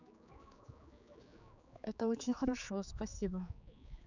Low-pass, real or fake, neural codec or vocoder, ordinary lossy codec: 7.2 kHz; fake; codec, 16 kHz, 4 kbps, X-Codec, HuBERT features, trained on general audio; none